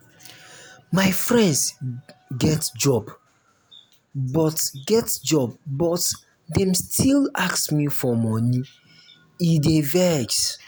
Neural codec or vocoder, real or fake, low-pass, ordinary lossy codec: none; real; none; none